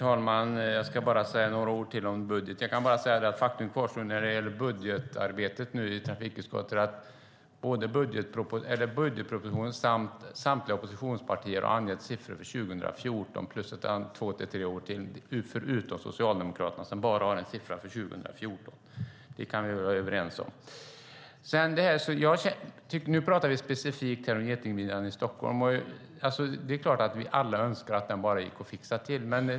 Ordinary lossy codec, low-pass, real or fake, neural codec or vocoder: none; none; real; none